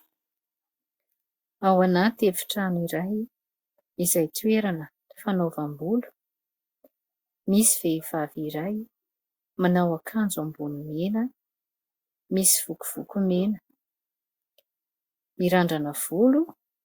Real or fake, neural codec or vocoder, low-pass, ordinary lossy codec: real; none; 19.8 kHz; Opus, 64 kbps